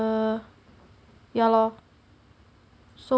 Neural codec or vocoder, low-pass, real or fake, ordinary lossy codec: none; none; real; none